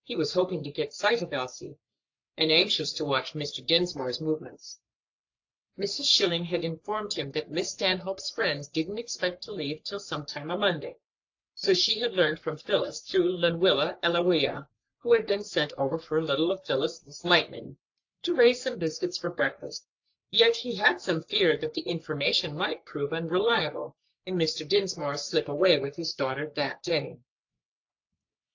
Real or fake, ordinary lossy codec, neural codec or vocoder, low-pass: fake; AAC, 48 kbps; codec, 44.1 kHz, 3.4 kbps, Pupu-Codec; 7.2 kHz